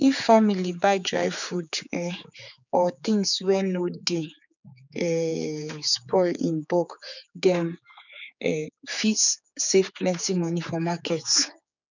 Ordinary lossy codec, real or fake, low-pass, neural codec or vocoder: none; fake; 7.2 kHz; codec, 16 kHz, 4 kbps, X-Codec, HuBERT features, trained on general audio